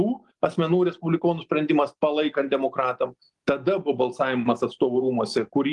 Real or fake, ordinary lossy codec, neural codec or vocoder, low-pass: real; Opus, 24 kbps; none; 10.8 kHz